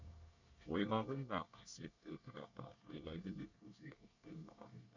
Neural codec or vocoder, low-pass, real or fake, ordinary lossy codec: codec, 24 kHz, 1 kbps, SNAC; 7.2 kHz; fake; none